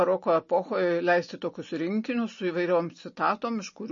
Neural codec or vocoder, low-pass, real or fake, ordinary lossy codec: none; 7.2 kHz; real; MP3, 32 kbps